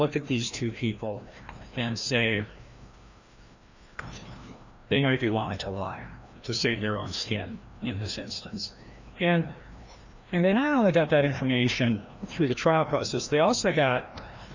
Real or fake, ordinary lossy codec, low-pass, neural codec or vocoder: fake; Opus, 64 kbps; 7.2 kHz; codec, 16 kHz, 1 kbps, FreqCodec, larger model